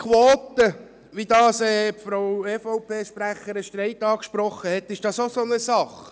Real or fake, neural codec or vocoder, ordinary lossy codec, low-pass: real; none; none; none